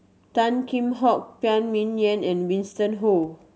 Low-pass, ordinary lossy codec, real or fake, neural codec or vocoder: none; none; real; none